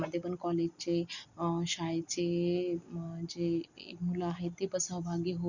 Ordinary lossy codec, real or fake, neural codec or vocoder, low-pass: Opus, 64 kbps; real; none; 7.2 kHz